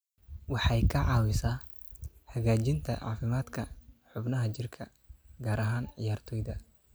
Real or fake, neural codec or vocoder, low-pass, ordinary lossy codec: real; none; none; none